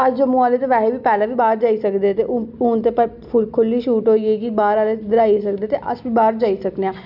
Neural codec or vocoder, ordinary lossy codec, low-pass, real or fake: none; none; 5.4 kHz; real